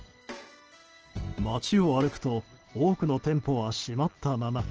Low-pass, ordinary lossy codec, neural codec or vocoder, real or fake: 7.2 kHz; Opus, 16 kbps; none; real